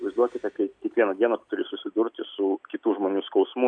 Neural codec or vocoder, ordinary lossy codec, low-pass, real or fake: none; AAC, 64 kbps; 9.9 kHz; real